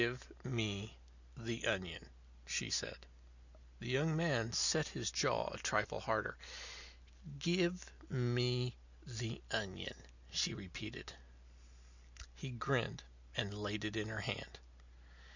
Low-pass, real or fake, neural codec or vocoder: 7.2 kHz; real; none